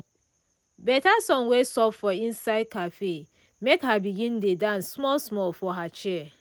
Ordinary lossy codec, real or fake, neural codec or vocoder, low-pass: none; real; none; none